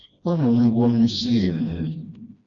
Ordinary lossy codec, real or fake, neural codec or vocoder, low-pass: Opus, 64 kbps; fake; codec, 16 kHz, 1 kbps, FreqCodec, smaller model; 7.2 kHz